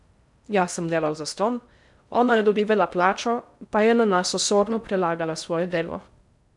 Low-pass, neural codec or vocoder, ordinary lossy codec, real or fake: 10.8 kHz; codec, 16 kHz in and 24 kHz out, 0.6 kbps, FocalCodec, streaming, 2048 codes; none; fake